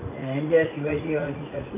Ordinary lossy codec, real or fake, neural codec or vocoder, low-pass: none; fake; vocoder, 44.1 kHz, 80 mel bands, Vocos; 3.6 kHz